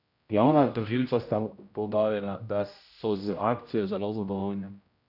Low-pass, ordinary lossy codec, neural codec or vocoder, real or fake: 5.4 kHz; none; codec, 16 kHz, 0.5 kbps, X-Codec, HuBERT features, trained on general audio; fake